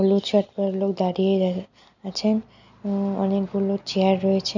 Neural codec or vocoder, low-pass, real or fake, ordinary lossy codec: none; 7.2 kHz; real; none